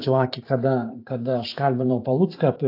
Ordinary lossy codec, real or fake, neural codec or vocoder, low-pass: AAC, 32 kbps; fake; vocoder, 44.1 kHz, 128 mel bands every 512 samples, BigVGAN v2; 5.4 kHz